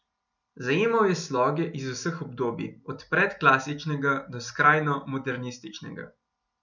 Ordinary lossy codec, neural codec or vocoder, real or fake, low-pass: none; none; real; 7.2 kHz